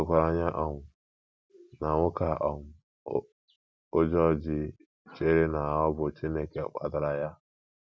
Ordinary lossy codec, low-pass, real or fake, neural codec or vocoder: none; none; real; none